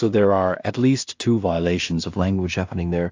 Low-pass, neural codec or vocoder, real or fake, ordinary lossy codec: 7.2 kHz; codec, 16 kHz in and 24 kHz out, 0.4 kbps, LongCat-Audio-Codec, two codebook decoder; fake; AAC, 48 kbps